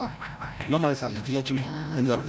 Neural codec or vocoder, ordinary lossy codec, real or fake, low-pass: codec, 16 kHz, 0.5 kbps, FreqCodec, larger model; none; fake; none